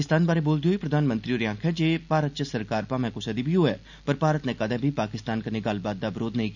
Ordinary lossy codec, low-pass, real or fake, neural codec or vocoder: none; 7.2 kHz; real; none